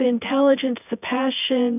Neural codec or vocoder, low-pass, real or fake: vocoder, 24 kHz, 100 mel bands, Vocos; 3.6 kHz; fake